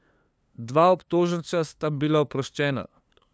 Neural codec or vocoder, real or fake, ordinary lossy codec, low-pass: codec, 16 kHz, 2 kbps, FunCodec, trained on LibriTTS, 25 frames a second; fake; none; none